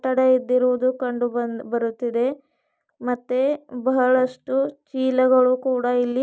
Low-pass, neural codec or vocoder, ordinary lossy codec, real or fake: none; none; none; real